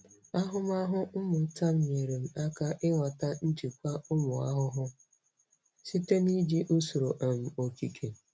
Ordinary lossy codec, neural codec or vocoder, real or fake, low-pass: none; none; real; none